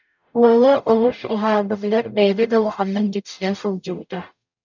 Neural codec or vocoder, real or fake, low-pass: codec, 44.1 kHz, 0.9 kbps, DAC; fake; 7.2 kHz